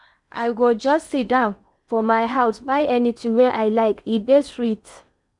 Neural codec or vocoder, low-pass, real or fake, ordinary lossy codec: codec, 16 kHz in and 24 kHz out, 0.8 kbps, FocalCodec, streaming, 65536 codes; 10.8 kHz; fake; none